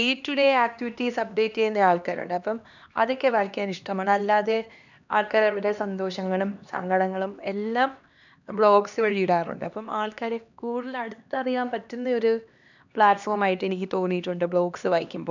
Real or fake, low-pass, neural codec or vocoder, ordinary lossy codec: fake; 7.2 kHz; codec, 16 kHz, 2 kbps, X-Codec, HuBERT features, trained on LibriSpeech; none